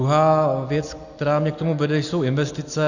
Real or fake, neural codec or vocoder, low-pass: real; none; 7.2 kHz